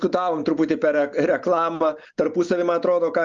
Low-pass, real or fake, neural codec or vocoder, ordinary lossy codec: 7.2 kHz; real; none; Opus, 32 kbps